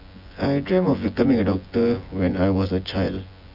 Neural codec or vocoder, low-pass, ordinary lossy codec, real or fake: vocoder, 24 kHz, 100 mel bands, Vocos; 5.4 kHz; none; fake